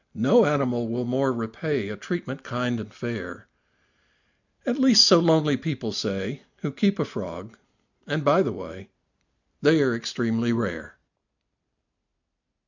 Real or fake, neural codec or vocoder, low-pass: real; none; 7.2 kHz